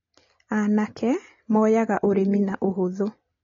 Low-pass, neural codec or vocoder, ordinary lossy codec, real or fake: 7.2 kHz; none; AAC, 32 kbps; real